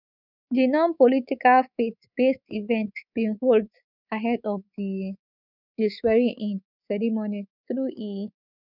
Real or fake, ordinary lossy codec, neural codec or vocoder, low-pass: fake; none; codec, 24 kHz, 3.1 kbps, DualCodec; 5.4 kHz